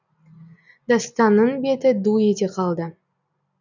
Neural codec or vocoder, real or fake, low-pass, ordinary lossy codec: none; real; 7.2 kHz; none